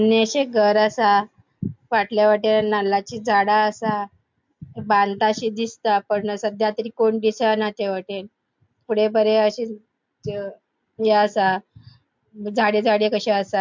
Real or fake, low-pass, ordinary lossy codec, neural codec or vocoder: real; 7.2 kHz; MP3, 64 kbps; none